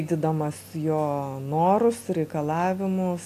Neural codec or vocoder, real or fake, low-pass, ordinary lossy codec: none; real; 14.4 kHz; AAC, 96 kbps